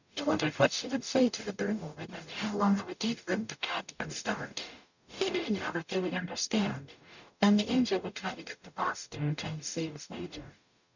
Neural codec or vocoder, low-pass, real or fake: codec, 44.1 kHz, 0.9 kbps, DAC; 7.2 kHz; fake